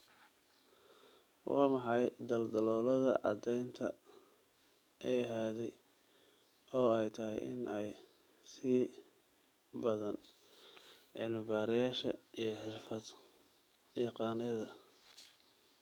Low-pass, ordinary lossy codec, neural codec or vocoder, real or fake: 19.8 kHz; none; codec, 44.1 kHz, 7.8 kbps, DAC; fake